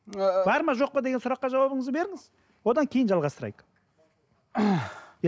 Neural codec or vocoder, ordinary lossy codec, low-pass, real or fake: none; none; none; real